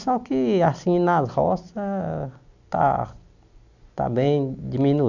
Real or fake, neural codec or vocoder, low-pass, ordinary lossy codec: real; none; 7.2 kHz; none